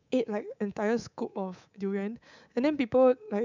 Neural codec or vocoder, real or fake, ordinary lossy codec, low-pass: codec, 24 kHz, 3.1 kbps, DualCodec; fake; none; 7.2 kHz